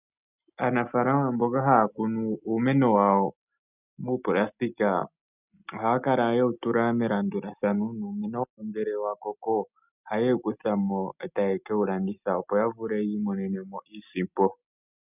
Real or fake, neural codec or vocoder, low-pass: real; none; 3.6 kHz